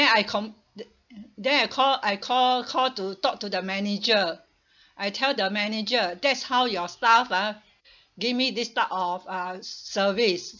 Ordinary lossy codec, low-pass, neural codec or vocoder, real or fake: none; 7.2 kHz; none; real